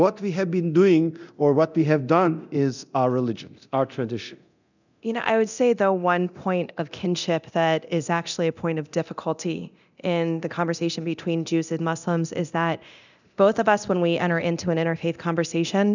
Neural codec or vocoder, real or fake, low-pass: codec, 24 kHz, 0.9 kbps, DualCodec; fake; 7.2 kHz